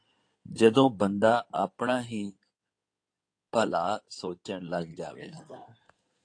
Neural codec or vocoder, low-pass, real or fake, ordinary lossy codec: codec, 16 kHz in and 24 kHz out, 2.2 kbps, FireRedTTS-2 codec; 9.9 kHz; fake; AAC, 48 kbps